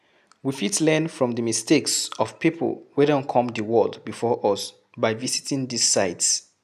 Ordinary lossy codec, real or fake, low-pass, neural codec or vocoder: none; real; 14.4 kHz; none